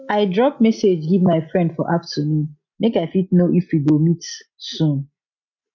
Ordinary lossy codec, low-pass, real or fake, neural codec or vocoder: MP3, 64 kbps; 7.2 kHz; real; none